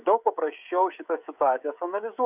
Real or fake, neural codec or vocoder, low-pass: fake; codec, 16 kHz, 16 kbps, FreqCodec, smaller model; 3.6 kHz